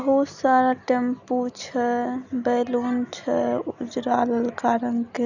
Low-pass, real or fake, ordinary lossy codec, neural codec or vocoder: 7.2 kHz; real; none; none